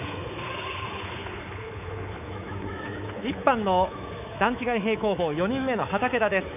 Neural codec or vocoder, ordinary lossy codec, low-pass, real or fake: codec, 24 kHz, 3.1 kbps, DualCodec; none; 3.6 kHz; fake